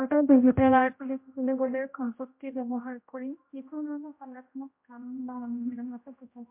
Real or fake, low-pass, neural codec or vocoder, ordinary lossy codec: fake; 3.6 kHz; codec, 16 kHz, 0.5 kbps, X-Codec, HuBERT features, trained on general audio; MP3, 32 kbps